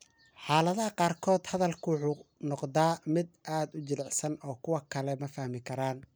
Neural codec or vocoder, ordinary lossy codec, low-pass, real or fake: none; none; none; real